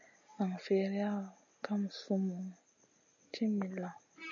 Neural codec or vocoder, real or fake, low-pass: none; real; 7.2 kHz